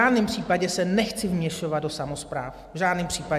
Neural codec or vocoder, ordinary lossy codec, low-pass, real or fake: none; MP3, 96 kbps; 14.4 kHz; real